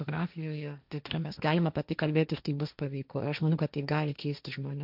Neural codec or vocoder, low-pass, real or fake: codec, 16 kHz, 1.1 kbps, Voila-Tokenizer; 5.4 kHz; fake